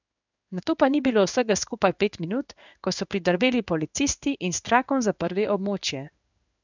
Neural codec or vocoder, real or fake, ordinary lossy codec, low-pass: codec, 16 kHz in and 24 kHz out, 1 kbps, XY-Tokenizer; fake; none; 7.2 kHz